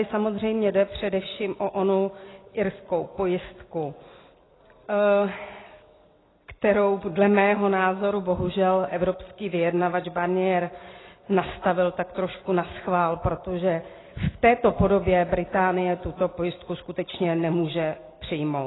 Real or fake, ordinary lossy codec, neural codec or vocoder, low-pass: real; AAC, 16 kbps; none; 7.2 kHz